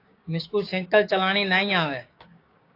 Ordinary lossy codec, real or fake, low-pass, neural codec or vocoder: AAC, 32 kbps; fake; 5.4 kHz; codec, 16 kHz, 6 kbps, DAC